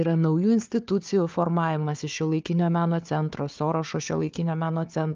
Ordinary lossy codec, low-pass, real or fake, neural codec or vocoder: Opus, 24 kbps; 7.2 kHz; fake; codec, 16 kHz, 4 kbps, FunCodec, trained on Chinese and English, 50 frames a second